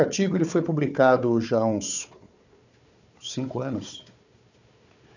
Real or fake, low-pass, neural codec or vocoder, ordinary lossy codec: fake; 7.2 kHz; codec, 16 kHz, 4 kbps, FunCodec, trained on Chinese and English, 50 frames a second; none